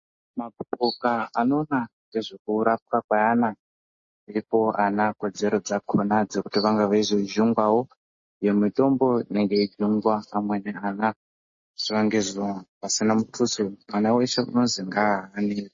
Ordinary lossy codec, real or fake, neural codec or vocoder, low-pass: MP3, 32 kbps; real; none; 7.2 kHz